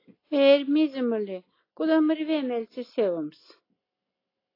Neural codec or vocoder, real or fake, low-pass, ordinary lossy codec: none; real; 5.4 kHz; AAC, 24 kbps